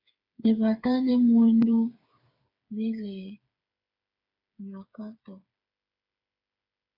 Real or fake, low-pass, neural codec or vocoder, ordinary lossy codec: fake; 5.4 kHz; codec, 16 kHz, 8 kbps, FreqCodec, smaller model; AAC, 48 kbps